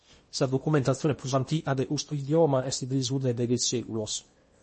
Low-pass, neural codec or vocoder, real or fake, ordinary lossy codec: 10.8 kHz; codec, 16 kHz in and 24 kHz out, 0.8 kbps, FocalCodec, streaming, 65536 codes; fake; MP3, 32 kbps